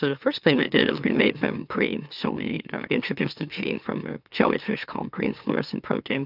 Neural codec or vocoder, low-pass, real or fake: autoencoder, 44.1 kHz, a latent of 192 numbers a frame, MeloTTS; 5.4 kHz; fake